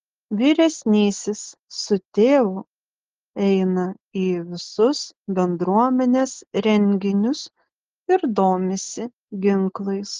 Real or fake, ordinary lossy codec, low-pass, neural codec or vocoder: real; Opus, 16 kbps; 7.2 kHz; none